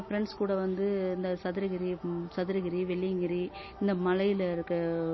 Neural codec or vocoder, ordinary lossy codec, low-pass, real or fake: none; MP3, 24 kbps; 7.2 kHz; real